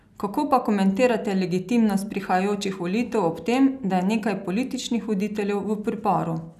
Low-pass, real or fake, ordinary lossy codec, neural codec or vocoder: 14.4 kHz; real; none; none